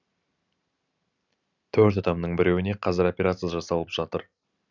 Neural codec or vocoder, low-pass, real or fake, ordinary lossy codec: none; 7.2 kHz; real; none